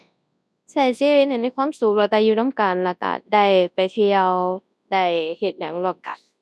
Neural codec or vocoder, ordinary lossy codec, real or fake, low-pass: codec, 24 kHz, 0.9 kbps, WavTokenizer, large speech release; none; fake; none